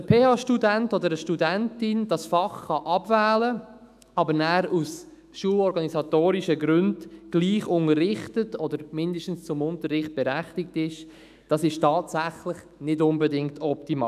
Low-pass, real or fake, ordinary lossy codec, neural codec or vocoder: 14.4 kHz; fake; none; autoencoder, 48 kHz, 128 numbers a frame, DAC-VAE, trained on Japanese speech